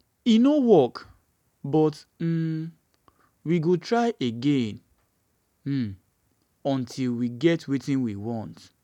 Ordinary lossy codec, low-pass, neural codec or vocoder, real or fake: none; 19.8 kHz; none; real